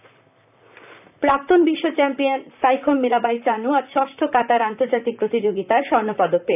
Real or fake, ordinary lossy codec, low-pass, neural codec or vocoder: fake; none; 3.6 kHz; vocoder, 44.1 kHz, 128 mel bands, Pupu-Vocoder